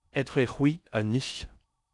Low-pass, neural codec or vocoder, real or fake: 10.8 kHz; codec, 16 kHz in and 24 kHz out, 0.6 kbps, FocalCodec, streaming, 4096 codes; fake